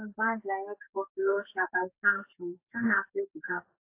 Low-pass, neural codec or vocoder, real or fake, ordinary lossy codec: 3.6 kHz; codec, 44.1 kHz, 2.6 kbps, SNAC; fake; AAC, 24 kbps